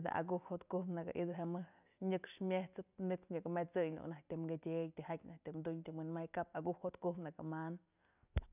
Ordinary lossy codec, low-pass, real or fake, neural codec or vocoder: none; 3.6 kHz; real; none